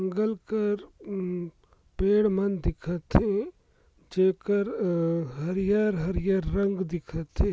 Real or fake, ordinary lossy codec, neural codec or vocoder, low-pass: real; none; none; none